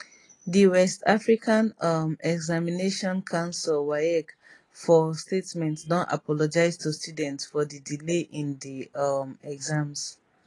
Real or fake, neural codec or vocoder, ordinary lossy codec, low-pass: real; none; AAC, 32 kbps; 10.8 kHz